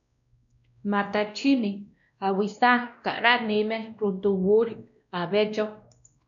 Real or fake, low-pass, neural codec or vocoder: fake; 7.2 kHz; codec, 16 kHz, 1 kbps, X-Codec, WavLM features, trained on Multilingual LibriSpeech